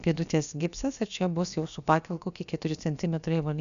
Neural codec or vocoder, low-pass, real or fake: codec, 16 kHz, about 1 kbps, DyCAST, with the encoder's durations; 7.2 kHz; fake